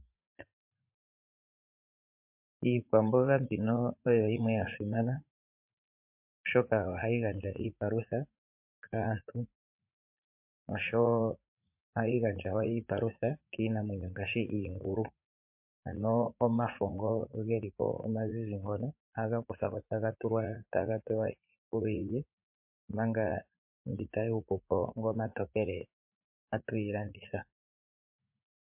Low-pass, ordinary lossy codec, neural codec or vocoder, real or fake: 3.6 kHz; MP3, 32 kbps; vocoder, 44.1 kHz, 80 mel bands, Vocos; fake